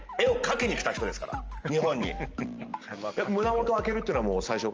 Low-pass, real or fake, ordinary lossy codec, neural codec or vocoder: 7.2 kHz; real; Opus, 24 kbps; none